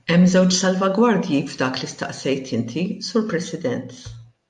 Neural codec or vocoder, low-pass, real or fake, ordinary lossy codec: none; 10.8 kHz; real; AAC, 64 kbps